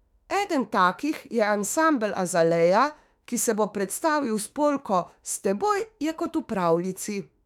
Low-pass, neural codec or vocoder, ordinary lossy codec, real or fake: 19.8 kHz; autoencoder, 48 kHz, 32 numbers a frame, DAC-VAE, trained on Japanese speech; none; fake